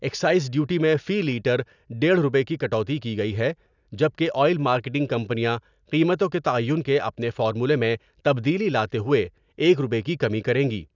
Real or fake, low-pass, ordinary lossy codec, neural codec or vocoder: real; 7.2 kHz; none; none